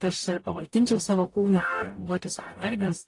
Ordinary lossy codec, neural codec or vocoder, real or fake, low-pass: AAC, 48 kbps; codec, 44.1 kHz, 0.9 kbps, DAC; fake; 10.8 kHz